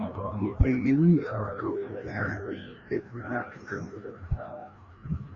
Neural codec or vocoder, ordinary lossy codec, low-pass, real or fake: codec, 16 kHz, 1 kbps, FreqCodec, larger model; Opus, 64 kbps; 7.2 kHz; fake